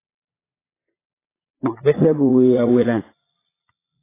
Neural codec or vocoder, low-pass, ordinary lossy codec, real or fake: vocoder, 22.05 kHz, 80 mel bands, Vocos; 3.6 kHz; AAC, 16 kbps; fake